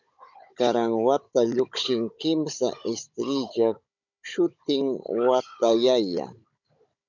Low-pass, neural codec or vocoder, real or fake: 7.2 kHz; codec, 16 kHz, 16 kbps, FunCodec, trained on Chinese and English, 50 frames a second; fake